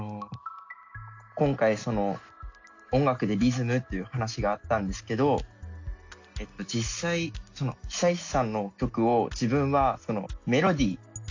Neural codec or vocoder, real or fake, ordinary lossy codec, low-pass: none; real; AAC, 48 kbps; 7.2 kHz